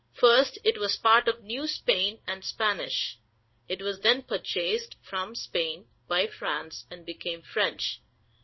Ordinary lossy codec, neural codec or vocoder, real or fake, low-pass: MP3, 24 kbps; codec, 16 kHz in and 24 kHz out, 1 kbps, XY-Tokenizer; fake; 7.2 kHz